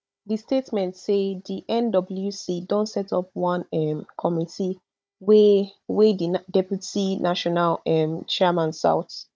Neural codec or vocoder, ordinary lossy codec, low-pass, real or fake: codec, 16 kHz, 16 kbps, FunCodec, trained on Chinese and English, 50 frames a second; none; none; fake